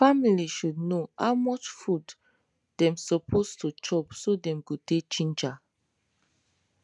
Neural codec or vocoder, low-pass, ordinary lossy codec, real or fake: none; 10.8 kHz; none; real